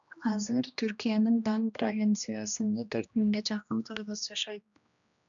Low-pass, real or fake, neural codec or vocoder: 7.2 kHz; fake; codec, 16 kHz, 1 kbps, X-Codec, HuBERT features, trained on general audio